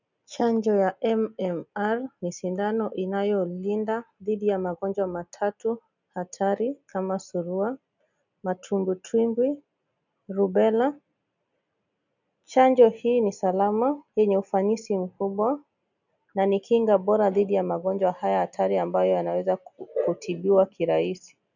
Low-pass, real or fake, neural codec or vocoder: 7.2 kHz; real; none